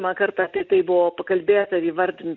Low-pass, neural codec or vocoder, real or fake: 7.2 kHz; none; real